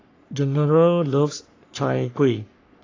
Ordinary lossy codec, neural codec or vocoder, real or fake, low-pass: AAC, 32 kbps; codec, 44.1 kHz, 3.4 kbps, Pupu-Codec; fake; 7.2 kHz